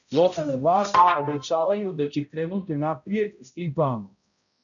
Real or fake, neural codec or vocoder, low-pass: fake; codec, 16 kHz, 0.5 kbps, X-Codec, HuBERT features, trained on balanced general audio; 7.2 kHz